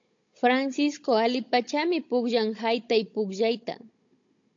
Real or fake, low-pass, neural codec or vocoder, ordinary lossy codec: fake; 7.2 kHz; codec, 16 kHz, 16 kbps, FunCodec, trained on Chinese and English, 50 frames a second; AAC, 48 kbps